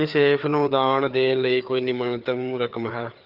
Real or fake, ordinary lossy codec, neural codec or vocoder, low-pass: fake; Opus, 32 kbps; codec, 16 kHz, 4 kbps, FreqCodec, larger model; 5.4 kHz